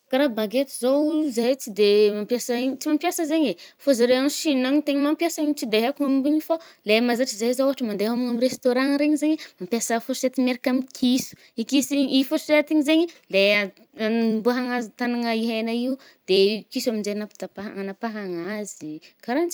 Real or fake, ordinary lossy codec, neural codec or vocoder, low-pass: fake; none; vocoder, 44.1 kHz, 128 mel bands every 256 samples, BigVGAN v2; none